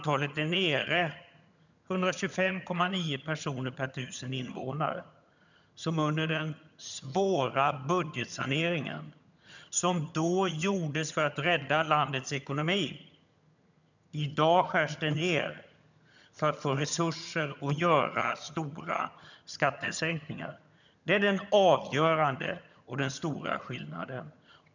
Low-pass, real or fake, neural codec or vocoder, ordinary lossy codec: 7.2 kHz; fake; vocoder, 22.05 kHz, 80 mel bands, HiFi-GAN; none